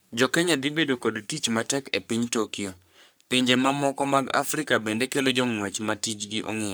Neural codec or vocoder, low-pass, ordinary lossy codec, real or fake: codec, 44.1 kHz, 3.4 kbps, Pupu-Codec; none; none; fake